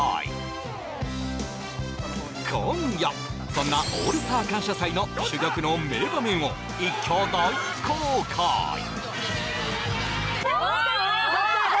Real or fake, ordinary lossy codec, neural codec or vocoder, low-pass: real; none; none; none